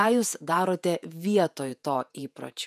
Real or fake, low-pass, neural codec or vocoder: fake; 14.4 kHz; vocoder, 48 kHz, 128 mel bands, Vocos